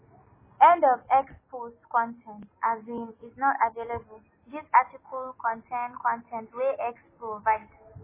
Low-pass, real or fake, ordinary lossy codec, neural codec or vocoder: 3.6 kHz; real; MP3, 16 kbps; none